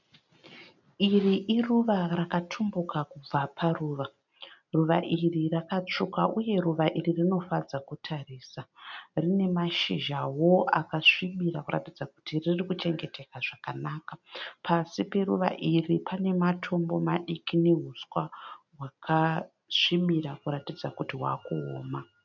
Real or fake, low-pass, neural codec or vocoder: real; 7.2 kHz; none